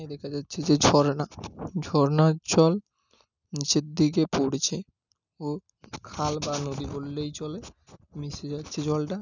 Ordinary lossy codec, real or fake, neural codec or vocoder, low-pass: none; real; none; 7.2 kHz